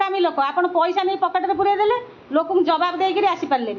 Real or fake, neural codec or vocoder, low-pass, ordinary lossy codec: real; none; 7.2 kHz; MP3, 48 kbps